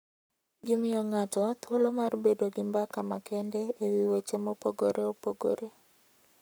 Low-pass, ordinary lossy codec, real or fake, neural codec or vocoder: none; none; fake; codec, 44.1 kHz, 7.8 kbps, Pupu-Codec